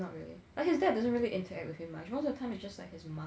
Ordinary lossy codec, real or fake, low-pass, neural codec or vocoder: none; real; none; none